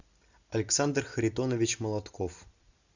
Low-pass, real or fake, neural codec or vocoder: 7.2 kHz; real; none